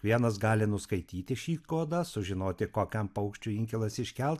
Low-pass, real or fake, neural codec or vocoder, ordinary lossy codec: 14.4 kHz; real; none; AAC, 96 kbps